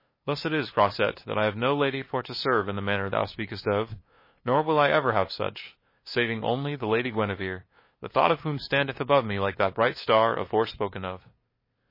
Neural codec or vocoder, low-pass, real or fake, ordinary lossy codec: codec, 16 kHz, 2 kbps, FunCodec, trained on LibriTTS, 25 frames a second; 5.4 kHz; fake; MP3, 24 kbps